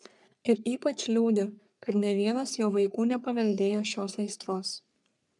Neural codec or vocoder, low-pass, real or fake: codec, 44.1 kHz, 3.4 kbps, Pupu-Codec; 10.8 kHz; fake